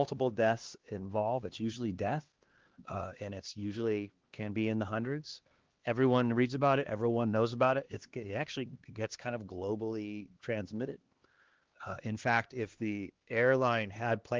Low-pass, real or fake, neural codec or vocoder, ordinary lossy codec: 7.2 kHz; fake; codec, 16 kHz, 2 kbps, X-Codec, HuBERT features, trained on LibriSpeech; Opus, 16 kbps